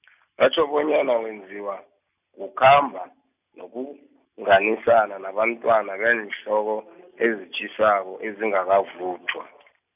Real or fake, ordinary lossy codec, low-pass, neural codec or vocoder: real; none; 3.6 kHz; none